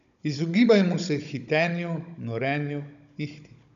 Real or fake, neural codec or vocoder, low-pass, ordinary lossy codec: fake; codec, 16 kHz, 16 kbps, FunCodec, trained on Chinese and English, 50 frames a second; 7.2 kHz; none